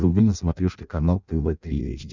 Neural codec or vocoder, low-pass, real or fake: codec, 16 kHz in and 24 kHz out, 0.6 kbps, FireRedTTS-2 codec; 7.2 kHz; fake